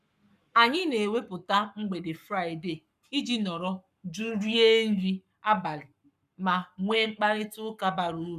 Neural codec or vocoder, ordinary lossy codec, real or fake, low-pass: codec, 44.1 kHz, 7.8 kbps, Pupu-Codec; none; fake; 14.4 kHz